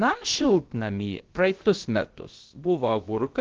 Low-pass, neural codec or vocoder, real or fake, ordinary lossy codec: 7.2 kHz; codec, 16 kHz, about 1 kbps, DyCAST, with the encoder's durations; fake; Opus, 16 kbps